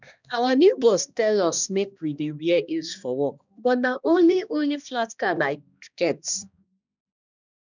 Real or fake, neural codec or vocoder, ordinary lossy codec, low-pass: fake; codec, 16 kHz, 1 kbps, X-Codec, HuBERT features, trained on balanced general audio; none; 7.2 kHz